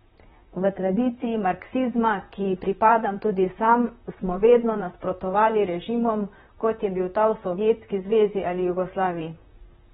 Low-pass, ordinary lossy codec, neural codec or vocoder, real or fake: 19.8 kHz; AAC, 16 kbps; vocoder, 44.1 kHz, 128 mel bands, Pupu-Vocoder; fake